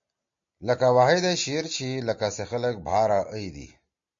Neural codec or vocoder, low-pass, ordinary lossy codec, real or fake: none; 7.2 kHz; MP3, 48 kbps; real